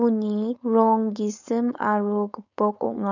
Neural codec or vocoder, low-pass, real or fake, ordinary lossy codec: codec, 16 kHz, 4.8 kbps, FACodec; 7.2 kHz; fake; none